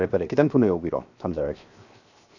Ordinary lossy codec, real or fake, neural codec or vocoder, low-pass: none; fake; codec, 16 kHz, 0.7 kbps, FocalCodec; 7.2 kHz